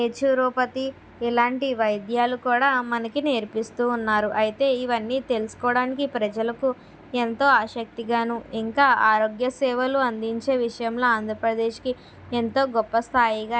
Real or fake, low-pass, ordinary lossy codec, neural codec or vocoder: real; none; none; none